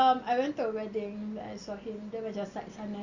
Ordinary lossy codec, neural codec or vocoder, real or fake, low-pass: Opus, 64 kbps; none; real; 7.2 kHz